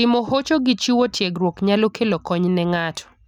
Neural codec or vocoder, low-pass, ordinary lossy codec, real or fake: none; 19.8 kHz; none; real